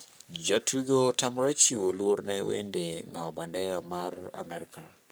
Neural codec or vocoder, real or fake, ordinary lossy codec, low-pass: codec, 44.1 kHz, 3.4 kbps, Pupu-Codec; fake; none; none